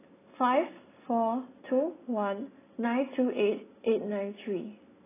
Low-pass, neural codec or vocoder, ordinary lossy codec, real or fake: 3.6 kHz; none; AAC, 16 kbps; real